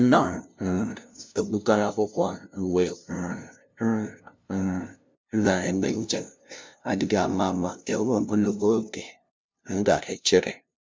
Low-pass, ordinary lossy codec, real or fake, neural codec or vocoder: none; none; fake; codec, 16 kHz, 0.5 kbps, FunCodec, trained on LibriTTS, 25 frames a second